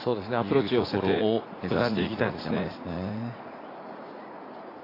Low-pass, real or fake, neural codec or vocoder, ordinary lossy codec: 5.4 kHz; fake; vocoder, 44.1 kHz, 80 mel bands, Vocos; AAC, 24 kbps